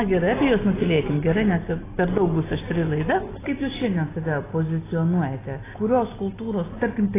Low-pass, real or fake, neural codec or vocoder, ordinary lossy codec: 3.6 kHz; real; none; AAC, 16 kbps